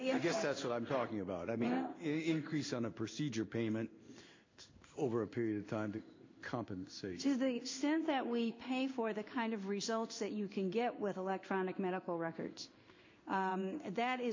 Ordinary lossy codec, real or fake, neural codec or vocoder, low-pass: MP3, 48 kbps; fake; codec, 16 kHz in and 24 kHz out, 1 kbps, XY-Tokenizer; 7.2 kHz